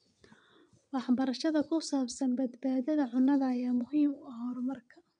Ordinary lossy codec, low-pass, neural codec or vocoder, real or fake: none; 9.9 kHz; vocoder, 22.05 kHz, 80 mel bands, WaveNeXt; fake